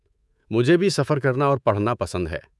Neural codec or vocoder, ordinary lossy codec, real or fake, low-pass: codec, 24 kHz, 3.1 kbps, DualCodec; none; fake; none